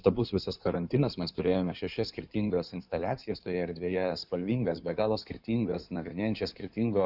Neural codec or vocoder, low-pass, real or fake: codec, 16 kHz in and 24 kHz out, 2.2 kbps, FireRedTTS-2 codec; 5.4 kHz; fake